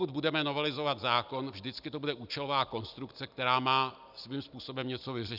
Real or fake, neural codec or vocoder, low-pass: real; none; 5.4 kHz